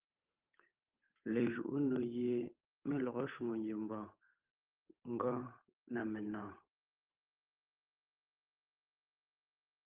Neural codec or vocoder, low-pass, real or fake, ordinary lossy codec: codec, 16 kHz, 8 kbps, FunCodec, trained on Chinese and English, 25 frames a second; 3.6 kHz; fake; Opus, 32 kbps